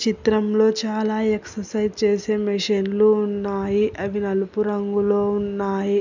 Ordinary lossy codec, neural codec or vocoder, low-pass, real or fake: none; none; 7.2 kHz; real